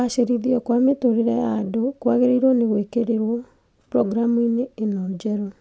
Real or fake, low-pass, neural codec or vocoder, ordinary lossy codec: real; none; none; none